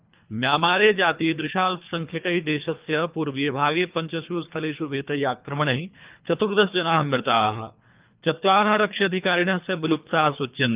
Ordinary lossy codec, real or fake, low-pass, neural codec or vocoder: Opus, 32 kbps; fake; 3.6 kHz; codec, 24 kHz, 3 kbps, HILCodec